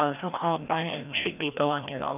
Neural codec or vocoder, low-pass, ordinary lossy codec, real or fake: codec, 16 kHz, 1 kbps, FreqCodec, larger model; 3.6 kHz; none; fake